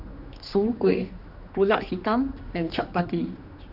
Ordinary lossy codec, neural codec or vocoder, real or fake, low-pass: none; codec, 16 kHz, 2 kbps, X-Codec, HuBERT features, trained on general audio; fake; 5.4 kHz